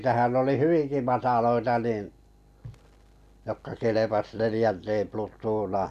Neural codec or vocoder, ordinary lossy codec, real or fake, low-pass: none; none; real; 14.4 kHz